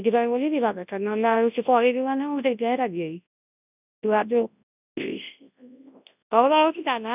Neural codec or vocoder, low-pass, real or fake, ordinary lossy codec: codec, 24 kHz, 0.9 kbps, WavTokenizer, large speech release; 3.6 kHz; fake; none